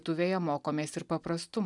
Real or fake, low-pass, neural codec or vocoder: real; 10.8 kHz; none